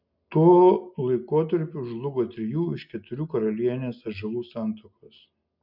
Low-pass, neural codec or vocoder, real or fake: 5.4 kHz; none; real